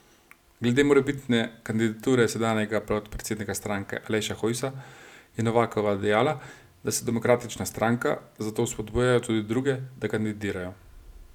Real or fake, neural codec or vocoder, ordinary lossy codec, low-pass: real; none; none; 19.8 kHz